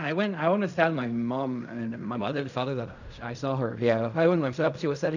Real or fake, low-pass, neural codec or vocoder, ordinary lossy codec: fake; 7.2 kHz; codec, 16 kHz in and 24 kHz out, 0.4 kbps, LongCat-Audio-Codec, fine tuned four codebook decoder; none